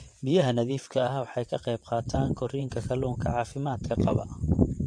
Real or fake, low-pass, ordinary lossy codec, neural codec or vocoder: fake; 9.9 kHz; MP3, 48 kbps; vocoder, 22.05 kHz, 80 mel bands, WaveNeXt